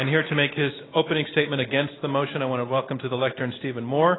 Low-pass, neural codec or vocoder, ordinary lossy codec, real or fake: 7.2 kHz; none; AAC, 16 kbps; real